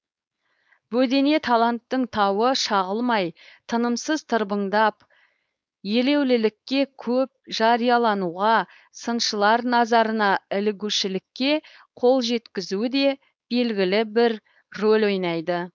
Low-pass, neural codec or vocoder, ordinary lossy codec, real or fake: none; codec, 16 kHz, 4.8 kbps, FACodec; none; fake